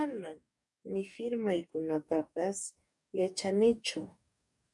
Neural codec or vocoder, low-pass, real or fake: codec, 44.1 kHz, 2.6 kbps, DAC; 10.8 kHz; fake